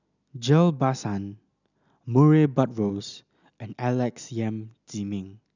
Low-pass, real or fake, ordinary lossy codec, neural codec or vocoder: 7.2 kHz; real; none; none